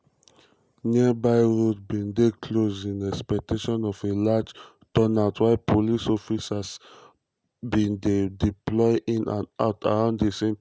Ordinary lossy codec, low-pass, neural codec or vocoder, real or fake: none; none; none; real